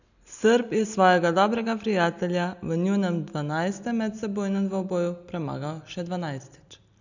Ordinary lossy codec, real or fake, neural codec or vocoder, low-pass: none; real; none; 7.2 kHz